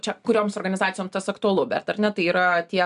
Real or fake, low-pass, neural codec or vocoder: real; 10.8 kHz; none